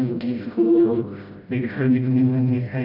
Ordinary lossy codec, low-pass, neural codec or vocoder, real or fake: none; 5.4 kHz; codec, 16 kHz, 0.5 kbps, FreqCodec, smaller model; fake